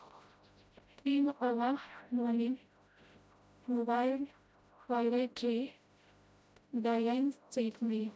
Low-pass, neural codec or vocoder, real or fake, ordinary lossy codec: none; codec, 16 kHz, 0.5 kbps, FreqCodec, smaller model; fake; none